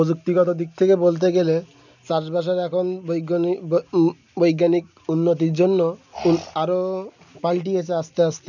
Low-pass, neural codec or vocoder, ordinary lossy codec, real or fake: 7.2 kHz; none; none; real